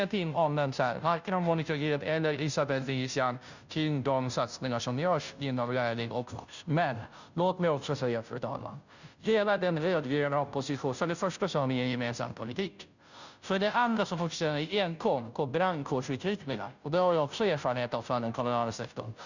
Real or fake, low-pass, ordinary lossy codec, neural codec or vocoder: fake; 7.2 kHz; none; codec, 16 kHz, 0.5 kbps, FunCodec, trained on Chinese and English, 25 frames a second